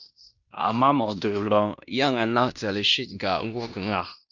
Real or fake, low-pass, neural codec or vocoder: fake; 7.2 kHz; codec, 16 kHz in and 24 kHz out, 0.9 kbps, LongCat-Audio-Codec, fine tuned four codebook decoder